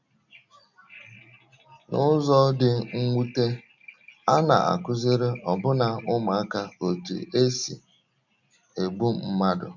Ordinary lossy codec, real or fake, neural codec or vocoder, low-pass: none; real; none; 7.2 kHz